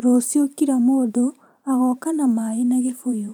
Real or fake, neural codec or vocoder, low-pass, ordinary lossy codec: fake; vocoder, 44.1 kHz, 128 mel bands every 512 samples, BigVGAN v2; none; none